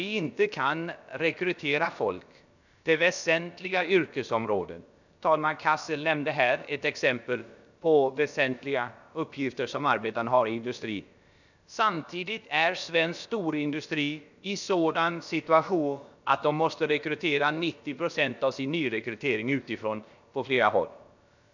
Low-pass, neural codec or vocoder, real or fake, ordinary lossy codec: 7.2 kHz; codec, 16 kHz, about 1 kbps, DyCAST, with the encoder's durations; fake; none